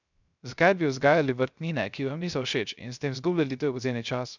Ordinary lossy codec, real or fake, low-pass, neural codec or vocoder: none; fake; 7.2 kHz; codec, 16 kHz, 0.3 kbps, FocalCodec